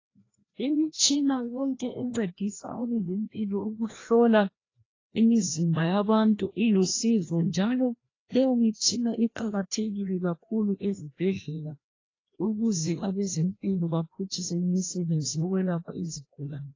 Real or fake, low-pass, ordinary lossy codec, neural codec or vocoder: fake; 7.2 kHz; AAC, 32 kbps; codec, 16 kHz, 1 kbps, FreqCodec, larger model